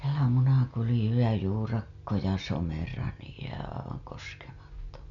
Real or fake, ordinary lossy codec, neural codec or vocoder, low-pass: real; none; none; 7.2 kHz